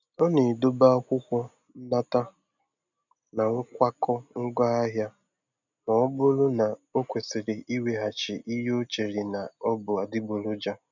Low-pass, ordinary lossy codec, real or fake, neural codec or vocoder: 7.2 kHz; none; real; none